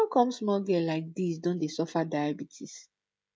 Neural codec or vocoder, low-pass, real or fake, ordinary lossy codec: codec, 16 kHz, 16 kbps, FreqCodec, smaller model; none; fake; none